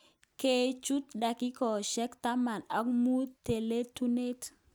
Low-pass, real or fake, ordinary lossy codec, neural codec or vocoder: none; real; none; none